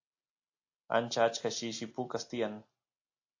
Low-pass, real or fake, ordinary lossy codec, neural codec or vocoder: 7.2 kHz; real; AAC, 48 kbps; none